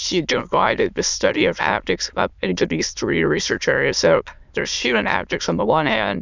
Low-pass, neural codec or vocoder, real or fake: 7.2 kHz; autoencoder, 22.05 kHz, a latent of 192 numbers a frame, VITS, trained on many speakers; fake